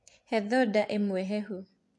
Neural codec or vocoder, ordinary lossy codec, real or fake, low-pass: vocoder, 24 kHz, 100 mel bands, Vocos; AAC, 64 kbps; fake; 10.8 kHz